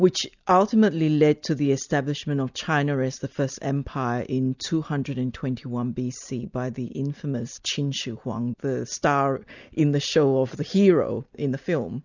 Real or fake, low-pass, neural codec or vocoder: real; 7.2 kHz; none